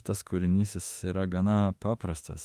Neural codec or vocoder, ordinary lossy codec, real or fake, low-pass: autoencoder, 48 kHz, 32 numbers a frame, DAC-VAE, trained on Japanese speech; Opus, 32 kbps; fake; 14.4 kHz